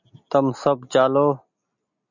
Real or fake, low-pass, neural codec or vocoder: real; 7.2 kHz; none